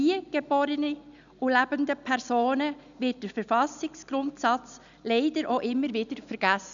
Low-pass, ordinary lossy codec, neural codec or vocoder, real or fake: 7.2 kHz; none; none; real